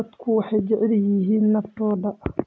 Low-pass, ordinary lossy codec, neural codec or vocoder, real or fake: none; none; none; real